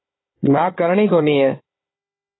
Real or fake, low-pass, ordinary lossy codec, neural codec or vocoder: fake; 7.2 kHz; AAC, 16 kbps; codec, 16 kHz, 4 kbps, FunCodec, trained on Chinese and English, 50 frames a second